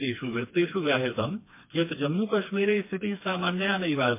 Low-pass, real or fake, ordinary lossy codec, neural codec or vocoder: 3.6 kHz; fake; MP3, 24 kbps; codec, 16 kHz, 2 kbps, FreqCodec, smaller model